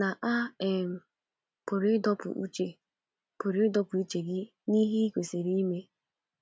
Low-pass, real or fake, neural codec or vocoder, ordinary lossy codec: none; real; none; none